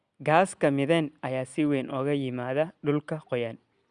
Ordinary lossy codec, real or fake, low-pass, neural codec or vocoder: Opus, 64 kbps; real; 9.9 kHz; none